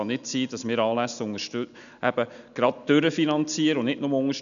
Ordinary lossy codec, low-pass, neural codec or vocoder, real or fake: none; 7.2 kHz; none; real